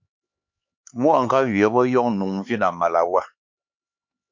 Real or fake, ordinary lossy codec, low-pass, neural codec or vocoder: fake; MP3, 48 kbps; 7.2 kHz; codec, 16 kHz, 4 kbps, X-Codec, HuBERT features, trained on LibriSpeech